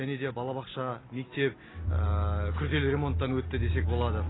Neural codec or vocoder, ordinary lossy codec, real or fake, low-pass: none; AAC, 16 kbps; real; 7.2 kHz